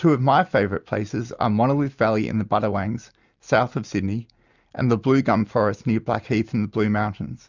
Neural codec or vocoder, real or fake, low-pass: none; real; 7.2 kHz